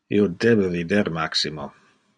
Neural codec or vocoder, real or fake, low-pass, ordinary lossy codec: none; real; 9.9 kHz; MP3, 96 kbps